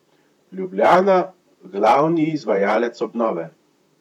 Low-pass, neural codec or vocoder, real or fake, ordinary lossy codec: 19.8 kHz; vocoder, 44.1 kHz, 128 mel bands, Pupu-Vocoder; fake; none